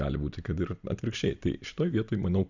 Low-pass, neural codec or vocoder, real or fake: 7.2 kHz; none; real